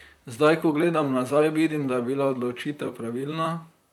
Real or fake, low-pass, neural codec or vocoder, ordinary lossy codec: fake; 19.8 kHz; vocoder, 44.1 kHz, 128 mel bands, Pupu-Vocoder; none